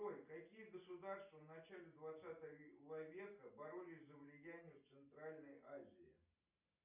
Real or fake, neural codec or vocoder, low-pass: real; none; 3.6 kHz